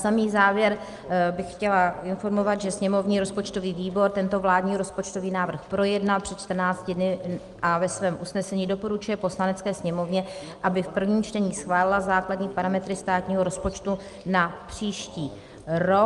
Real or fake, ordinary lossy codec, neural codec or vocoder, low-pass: real; Opus, 32 kbps; none; 10.8 kHz